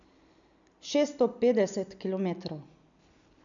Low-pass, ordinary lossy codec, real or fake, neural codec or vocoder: 7.2 kHz; none; real; none